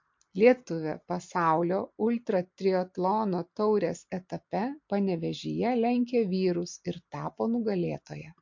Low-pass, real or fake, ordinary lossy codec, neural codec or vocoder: 7.2 kHz; real; MP3, 48 kbps; none